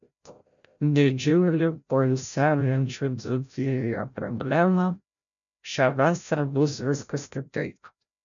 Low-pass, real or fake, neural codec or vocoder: 7.2 kHz; fake; codec, 16 kHz, 0.5 kbps, FreqCodec, larger model